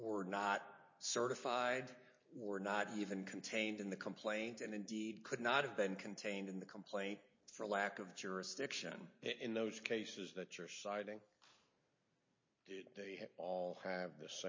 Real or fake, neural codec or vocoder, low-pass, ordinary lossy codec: real; none; 7.2 kHz; MP3, 32 kbps